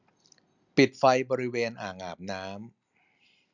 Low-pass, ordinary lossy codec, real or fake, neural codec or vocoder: 7.2 kHz; none; real; none